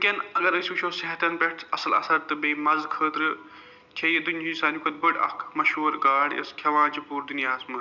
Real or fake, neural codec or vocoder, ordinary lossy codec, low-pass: real; none; none; 7.2 kHz